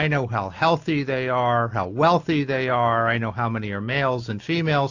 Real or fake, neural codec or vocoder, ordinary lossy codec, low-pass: real; none; AAC, 48 kbps; 7.2 kHz